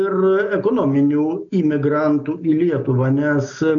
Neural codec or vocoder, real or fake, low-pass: none; real; 7.2 kHz